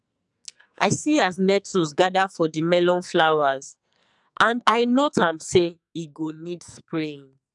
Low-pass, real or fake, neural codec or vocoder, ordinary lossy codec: 10.8 kHz; fake; codec, 44.1 kHz, 2.6 kbps, SNAC; none